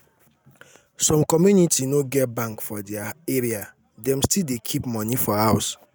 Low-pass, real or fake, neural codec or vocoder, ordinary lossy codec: none; real; none; none